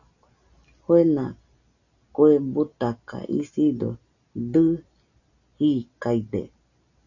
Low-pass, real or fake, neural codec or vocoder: 7.2 kHz; real; none